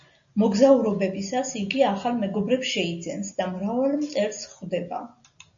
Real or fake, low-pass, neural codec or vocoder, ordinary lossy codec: real; 7.2 kHz; none; AAC, 64 kbps